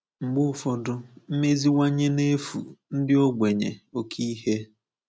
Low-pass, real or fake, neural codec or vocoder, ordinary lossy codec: none; real; none; none